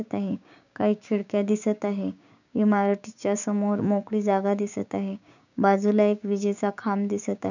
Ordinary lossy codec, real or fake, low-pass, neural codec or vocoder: none; fake; 7.2 kHz; codec, 16 kHz, 6 kbps, DAC